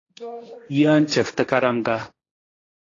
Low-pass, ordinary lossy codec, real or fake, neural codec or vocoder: 7.2 kHz; AAC, 32 kbps; fake; codec, 16 kHz, 1.1 kbps, Voila-Tokenizer